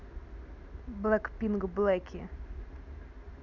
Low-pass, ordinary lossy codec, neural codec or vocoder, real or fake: 7.2 kHz; none; none; real